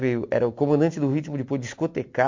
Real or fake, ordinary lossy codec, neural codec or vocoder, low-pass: real; MP3, 48 kbps; none; 7.2 kHz